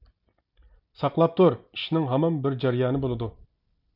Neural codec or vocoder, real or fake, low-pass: none; real; 5.4 kHz